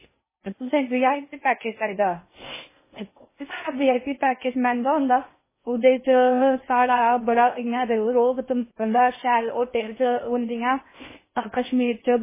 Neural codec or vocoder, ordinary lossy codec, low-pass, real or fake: codec, 16 kHz in and 24 kHz out, 0.6 kbps, FocalCodec, streaming, 4096 codes; MP3, 16 kbps; 3.6 kHz; fake